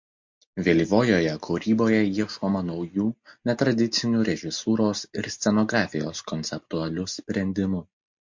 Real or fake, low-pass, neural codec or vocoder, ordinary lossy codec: real; 7.2 kHz; none; MP3, 48 kbps